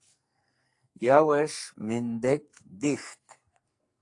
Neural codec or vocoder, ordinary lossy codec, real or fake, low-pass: codec, 44.1 kHz, 2.6 kbps, SNAC; AAC, 48 kbps; fake; 10.8 kHz